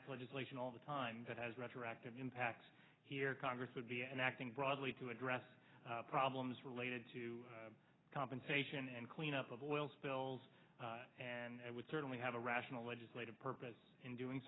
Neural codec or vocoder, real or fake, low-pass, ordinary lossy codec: none; real; 7.2 kHz; AAC, 16 kbps